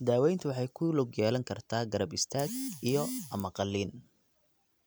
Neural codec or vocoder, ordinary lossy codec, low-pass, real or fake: none; none; none; real